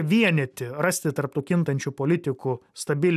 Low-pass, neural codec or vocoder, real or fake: 14.4 kHz; none; real